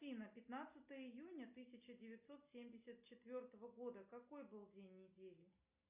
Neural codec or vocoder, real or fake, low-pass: none; real; 3.6 kHz